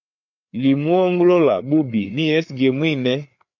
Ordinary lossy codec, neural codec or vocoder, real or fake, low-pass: MP3, 48 kbps; codec, 16 kHz, 4 kbps, FreqCodec, larger model; fake; 7.2 kHz